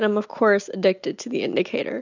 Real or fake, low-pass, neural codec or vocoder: real; 7.2 kHz; none